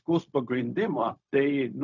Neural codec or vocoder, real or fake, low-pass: codec, 16 kHz, 0.4 kbps, LongCat-Audio-Codec; fake; 7.2 kHz